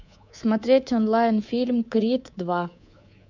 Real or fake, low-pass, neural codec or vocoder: fake; 7.2 kHz; codec, 24 kHz, 3.1 kbps, DualCodec